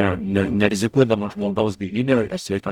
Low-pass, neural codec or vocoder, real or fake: 19.8 kHz; codec, 44.1 kHz, 0.9 kbps, DAC; fake